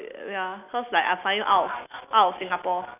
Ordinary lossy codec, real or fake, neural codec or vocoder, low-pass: none; real; none; 3.6 kHz